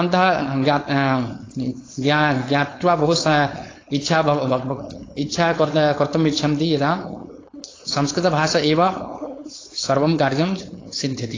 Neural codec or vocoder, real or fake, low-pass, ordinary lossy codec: codec, 16 kHz, 4.8 kbps, FACodec; fake; 7.2 kHz; AAC, 32 kbps